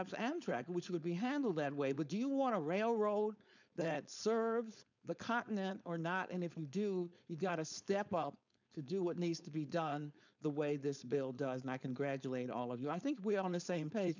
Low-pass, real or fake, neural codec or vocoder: 7.2 kHz; fake; codec, 16 kHz, 4.8 kbps, FACodec